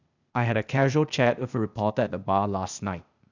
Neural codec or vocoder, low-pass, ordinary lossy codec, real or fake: codec, 16 kHz, 0.8 kbps, ZipCodec; 7.2 kHz; none; fake